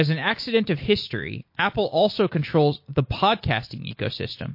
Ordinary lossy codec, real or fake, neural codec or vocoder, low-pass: MP3, 32 kbps; real; none; 5.4 kHz